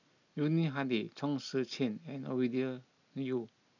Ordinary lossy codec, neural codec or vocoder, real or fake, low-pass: none; none; real; 7.2 kHz